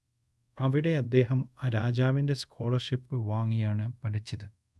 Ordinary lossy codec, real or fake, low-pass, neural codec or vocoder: none; fake; none; codec, 24 kHz, 0.5 kbps, DualCodec